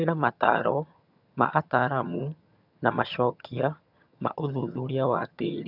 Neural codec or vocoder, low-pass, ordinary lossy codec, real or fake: vocoder, 22.05 kHz, 80 mel bands, HiFi-GAN; 5.4 kHz; none; fake